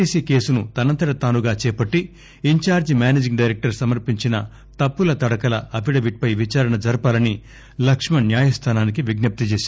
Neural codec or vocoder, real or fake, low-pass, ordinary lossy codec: none; real; none; none